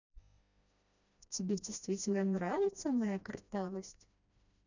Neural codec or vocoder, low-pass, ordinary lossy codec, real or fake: codec, 16 kHz, 1 kbps, FreqCodec, smaller model; 7.2 kHz; none; fake